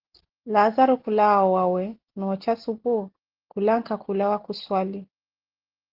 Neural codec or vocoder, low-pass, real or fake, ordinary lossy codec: none; 5.4 kHz; real; Opus, 16 kbps